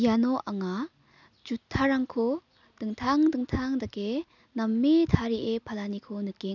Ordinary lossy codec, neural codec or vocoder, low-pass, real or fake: none; none; 7.2 kHz; real